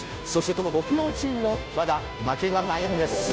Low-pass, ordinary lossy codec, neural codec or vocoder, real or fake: none; none; codec, 16 kHz, 0.5 kbps, FunCodec, trained on Chinese and English, 25 frames a second; fake